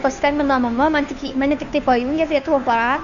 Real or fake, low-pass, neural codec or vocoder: fake; 7.2 kHz; codec, 16 kHz, 2 kbps, FunCodec, trained on Chinese and English, 25 frames a second